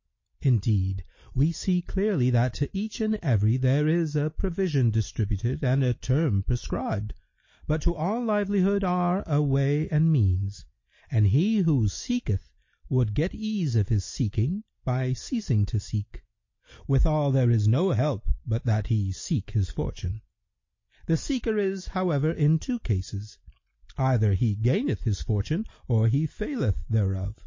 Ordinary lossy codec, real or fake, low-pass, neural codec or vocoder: MP3, 32 kbps; real; 7.2 kHz; none